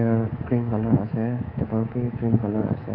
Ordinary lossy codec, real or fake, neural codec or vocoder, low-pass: AAC, 24 kbps; fake; codec, 24 kHz, 3.1 kbps, DualCodec; 5.4 kHz